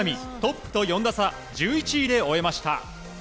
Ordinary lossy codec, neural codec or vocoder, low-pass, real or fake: none; none; none; real